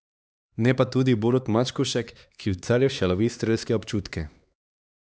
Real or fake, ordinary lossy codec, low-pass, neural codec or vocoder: fake; none; none; codec, 16 kHz, 2 kbps, X-Codec, HuBERT features, trained on LibriSpeech